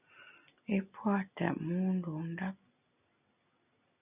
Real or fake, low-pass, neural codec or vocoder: real; 3.6 kHz; none